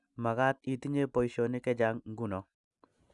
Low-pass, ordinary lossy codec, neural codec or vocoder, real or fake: 10.8 kHz; none; none; real